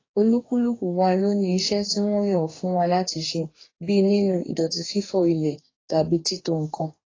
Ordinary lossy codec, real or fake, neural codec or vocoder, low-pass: AAC, 32 kbps; fake; codec, 44.1 kHz, 2.6 kbps, DAC; 7.2 kHz